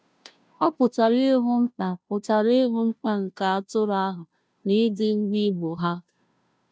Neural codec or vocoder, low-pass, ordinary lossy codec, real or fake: codec, 16 kHz, 0.5 kbps, FunCodec, trained on Chinese and English, 25 frames a second; none; none; fake